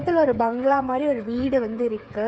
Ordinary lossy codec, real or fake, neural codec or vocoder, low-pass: none; fake; codec, 16 kHz, 8 kbps, FreqCodec, smaller model; none